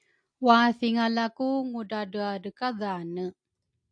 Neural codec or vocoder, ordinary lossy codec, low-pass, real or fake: none; AAC, 64 kbps; 9.9 kHz; real